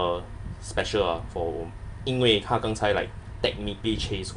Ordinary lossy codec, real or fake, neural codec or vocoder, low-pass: none; real; none; 10.8 kHz